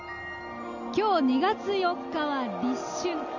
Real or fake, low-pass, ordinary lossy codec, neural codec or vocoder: real; 7.2 kHz; none; none